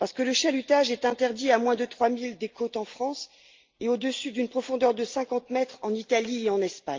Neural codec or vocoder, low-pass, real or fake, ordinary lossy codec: none; 7.2 kHz; real; Opus, 24 kbps